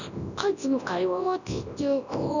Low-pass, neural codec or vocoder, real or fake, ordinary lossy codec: 7.2 kHz; codec, 24 kHz, 0.9 kbps, WavTokenizer, large speech release; fake; none